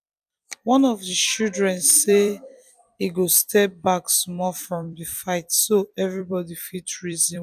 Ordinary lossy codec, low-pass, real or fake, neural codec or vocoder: none; 14.4 kHz; fake; vocoder, 44.1 kHz, 128 mel bands every 256 samples, BigVGAN v2